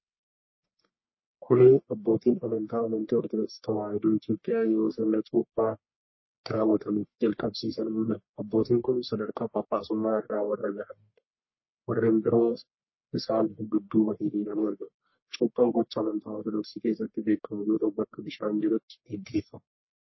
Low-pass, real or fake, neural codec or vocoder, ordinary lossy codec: 7.2 kHz; fake; codec, 44.1 kHz, 1.7 kbps, Pupu-Codec; MP3, 24 kbps